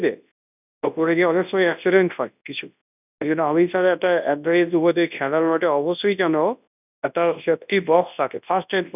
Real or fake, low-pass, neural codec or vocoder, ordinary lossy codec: fake; 3.6 kHz; codec, 24 kHz, 0.9 kbps, WavTokenizer, large speech release; none